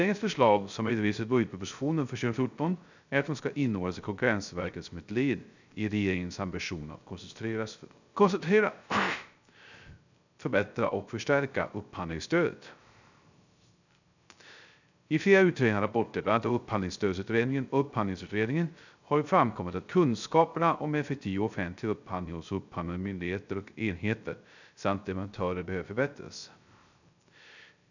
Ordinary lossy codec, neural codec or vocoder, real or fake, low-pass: none; codec, 16 kHz, 0.3 kbps, FocalCodec; fake; 7.2 kHz